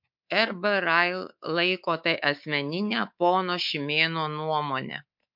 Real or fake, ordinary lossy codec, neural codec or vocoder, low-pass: fake; MP3, 48 kbps; codec, 24 kHz, 3.1 kbps, DualCodec; 5.4 kHz